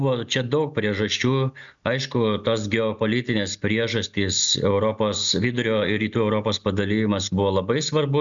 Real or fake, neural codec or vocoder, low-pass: real; none; 7.2 kHz